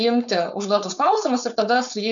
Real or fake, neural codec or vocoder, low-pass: fake; codec, 16 kHz, 4.8 kbps, FACodec; 7.2 kHz